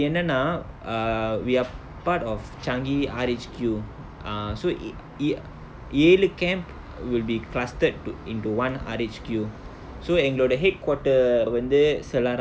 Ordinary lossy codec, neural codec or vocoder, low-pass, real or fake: none; none; none; real